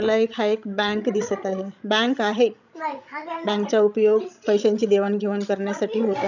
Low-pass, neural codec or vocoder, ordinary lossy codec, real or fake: 7.2 kHz; codec, 16 kHz, 16 kbps, FreqCodec, larger model; none; fake